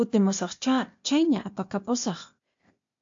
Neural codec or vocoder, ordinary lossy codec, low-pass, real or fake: codec, 16 kHz, 0.8 kbps, ZipCodec; MP3, 48 kbps; 7.2 kHz; fake